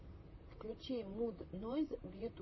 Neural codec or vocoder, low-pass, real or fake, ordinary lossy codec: vocoder, 44.1 kHz, 128 mel bands, Pupu-Vocoder; 7.2 kHz; fake; MP3, 24 kbps